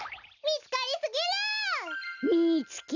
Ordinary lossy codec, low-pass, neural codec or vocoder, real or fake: none; 7.2 kHz; none; real